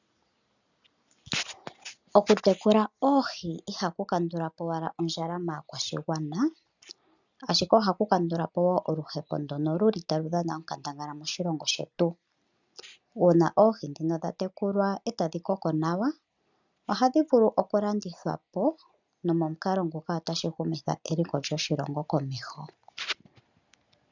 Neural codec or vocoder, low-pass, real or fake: none; 7.2 kHz; real